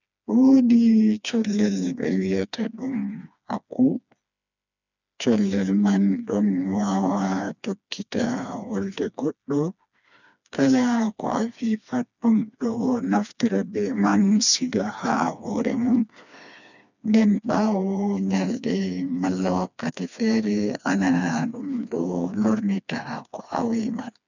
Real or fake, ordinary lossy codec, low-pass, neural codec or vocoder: fake; none; 7.2 kHz; codec, 16 kHz, 2 kbps, FreqCodec, smaller model